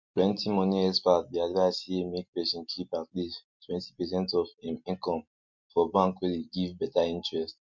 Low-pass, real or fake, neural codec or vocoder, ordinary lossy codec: 7.2 kHz; real; none; MP3, 48 kbps